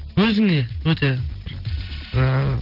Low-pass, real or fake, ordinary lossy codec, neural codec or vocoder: 5.4 kHz; real; Opus, 16 kbps; none